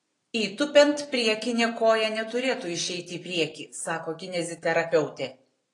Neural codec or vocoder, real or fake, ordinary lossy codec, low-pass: none; real; AAC, 32 kbps; 10.8 kHz